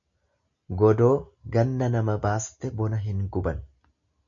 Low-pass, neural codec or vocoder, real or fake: 7.2 kHz; none; real